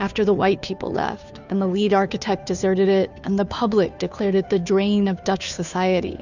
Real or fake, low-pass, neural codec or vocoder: fake; 7.2 kHz; codec, 16 kHz, 2 kbps, FunCodec, trained on Chinese and English, 25 frames a second